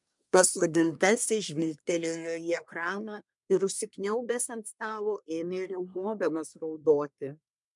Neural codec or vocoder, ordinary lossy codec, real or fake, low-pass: codec, 24 kHz, 1 kbps, SNAC; MP3, 96 kbps; fake; 10.8 kHz